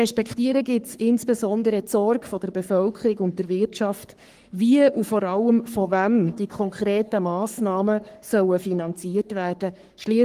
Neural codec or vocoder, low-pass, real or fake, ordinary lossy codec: codec, 44.1 kHz, 3.4 kbps, Pupu-Codec; 14.4 kHz; fake; Opus, 24 kbps